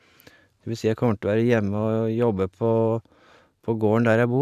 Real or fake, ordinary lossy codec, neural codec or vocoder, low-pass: fake; none; vocoder, 44.1 kHz, 128 mel bands every 512 samples, BigVGAN v2; 14.4 kHz